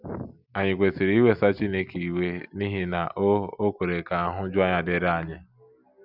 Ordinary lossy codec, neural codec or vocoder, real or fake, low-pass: none; none; real; 5.4 kHz